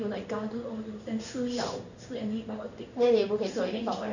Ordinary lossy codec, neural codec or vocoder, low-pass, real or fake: none; codec, 16 kHz in and 24 kHz out, 1 kbps, XY-Tokenizer; 7.2 kHz; fake